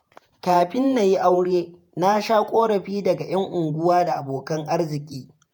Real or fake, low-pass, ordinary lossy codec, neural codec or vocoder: fake; none; none; vocoder, 48 kHz, 128 mel bands, Vocos